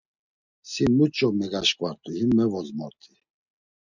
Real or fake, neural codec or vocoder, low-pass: real; none; 7.2 kHz